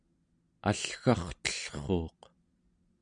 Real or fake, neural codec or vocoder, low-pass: real; none; 9.9 kHz